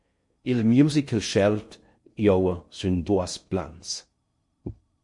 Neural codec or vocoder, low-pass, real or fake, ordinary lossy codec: codec, 16 kHz in and 24 kHz out, 0.6 kbps, FocalCodec, streaming, 4096 codes; 10.8 kHz; fake; MP3, 48 kbps